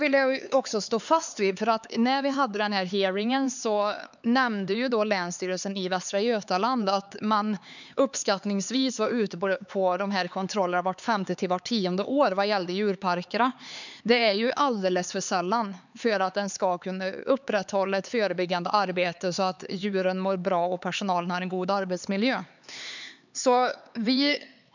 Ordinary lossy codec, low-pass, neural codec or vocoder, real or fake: none; 7.2 kHz; codec, 16 kHz, 4 kbps, X-Codec, HuBERT features, trained on LibriSpeech; fake